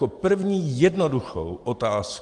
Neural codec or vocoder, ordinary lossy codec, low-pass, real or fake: none; Opus, 24 kbps; 10.8 kHz; real